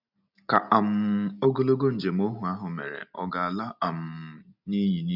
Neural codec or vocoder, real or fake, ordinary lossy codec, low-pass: none; real; none; 5.4 kHz